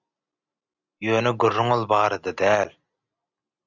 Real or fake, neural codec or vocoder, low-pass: real; none; 7.2 kHz